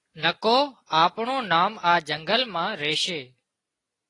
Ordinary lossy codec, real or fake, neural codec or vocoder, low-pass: AAC, 32 kbps; real; none; 10.8 kHz